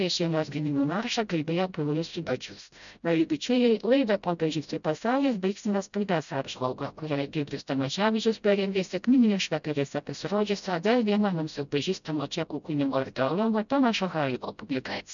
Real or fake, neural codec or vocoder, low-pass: fake; codec, 16 kHz, 0.5 kbps, FreqCodec, smaller model; 7.2 kHz